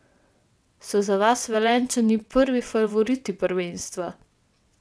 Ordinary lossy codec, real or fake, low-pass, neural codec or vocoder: none; fake; none; vocoder, 22.05 kHz, 80 mel bands, WaveNeXt